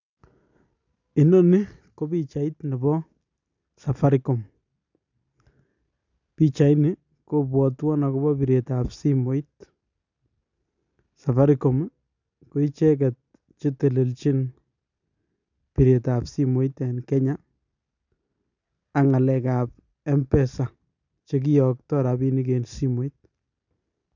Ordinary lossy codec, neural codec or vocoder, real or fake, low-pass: none; none; real; 7.2 kHz